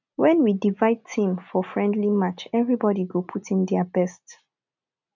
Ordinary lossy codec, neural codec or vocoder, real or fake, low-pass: none; none; real; 7.2 kHz